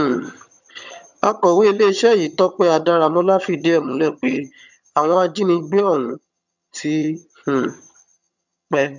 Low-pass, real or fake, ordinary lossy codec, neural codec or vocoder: 7.2 kHz; fake; none; vocoder, 22.05 kHz, 80 mel bands, HiFi-GAN